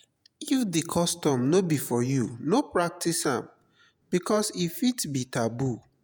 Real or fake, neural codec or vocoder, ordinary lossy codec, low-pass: fake; vocoder, 48 kHz, 128 mel bands, Vocos; none; none